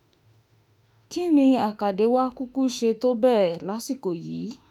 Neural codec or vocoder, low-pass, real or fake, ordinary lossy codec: autoencoder, 48 kHz, 32 numbers a frame, DAC-VAE, trained on Japanese speech; 19.8 kHz; fake; none